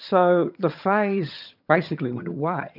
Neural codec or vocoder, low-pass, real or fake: vocoder, 22.05 kHz, 80 mel bands, HiFi-GAN; 5.4 kHz; fake